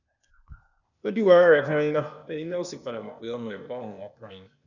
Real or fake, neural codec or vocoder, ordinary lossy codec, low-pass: fake; codec, 16 kHz, 0.8 kbps, ZipCodec; none; 7.2 kHz